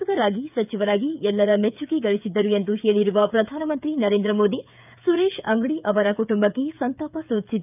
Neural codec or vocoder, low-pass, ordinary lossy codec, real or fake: codec, 16 kHz, 8 kbps, FreqCodec, smaller model; 3.6 kHz; none; fake